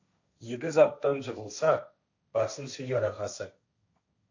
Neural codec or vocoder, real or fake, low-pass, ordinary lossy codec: codec, 16 kHz, 1.1 kbps, Voila-Tokenizer; fake; 7.2 kHz; AAC, 48 kbps